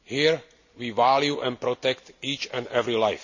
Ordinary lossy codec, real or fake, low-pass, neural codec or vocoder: none; real; 7.2 kHz; none